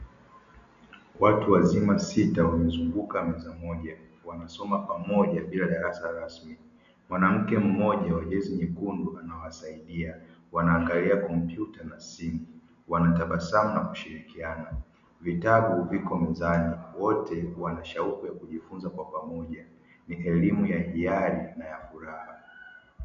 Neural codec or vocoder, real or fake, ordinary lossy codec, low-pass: none; real; MP3, 96 kbps; 7.2 kHz